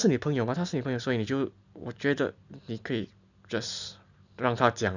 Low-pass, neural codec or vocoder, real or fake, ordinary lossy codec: 7.2 kHz; none; real; none